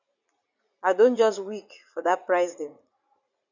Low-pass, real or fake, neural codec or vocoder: 7.2 kHz; fake; vocoder, 44.1 kHz, 80 mel bands, Vocos